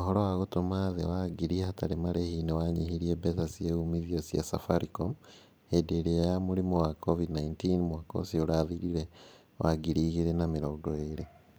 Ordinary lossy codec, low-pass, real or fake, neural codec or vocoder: none; none; fake; vocoder, 44.1 kHz, 128 mel bands every 512 samples, BigVGAN v2